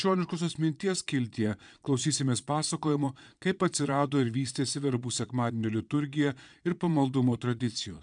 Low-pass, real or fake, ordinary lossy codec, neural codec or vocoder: 9.9 kHz; fake; MP3, 96 kbps; vocoder, 22.05 kHz, 80 mel bands, Vocos